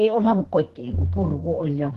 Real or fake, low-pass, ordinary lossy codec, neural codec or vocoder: fake; 14.4 kHz; Opus, 16 kbps; codec, 32 kHz, 1.9 kbps, SNAC